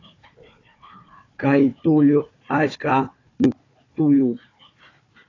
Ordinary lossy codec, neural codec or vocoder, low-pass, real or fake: AAC, 32 kbps; codec, 16 kHz, 4 kbps, FunCodec, trained on Chinese and English, 50 frames a second; 7.2 kHz; fake